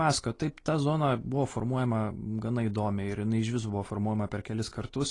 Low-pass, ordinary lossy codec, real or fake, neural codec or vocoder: 10.8 kHz; AAC, 32 kbps; real; none